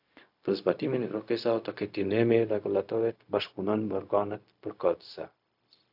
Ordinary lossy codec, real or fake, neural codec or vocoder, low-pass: AAC, 48 kbps; fake; codec, 16 kHz, 0.4 kbps, LongCat-Audio-Codec; 5.4 kHz